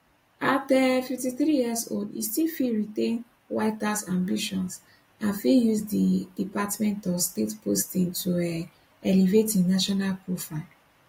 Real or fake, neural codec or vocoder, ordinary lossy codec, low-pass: real; none; AAC, 48 kbps; 19.8 kHz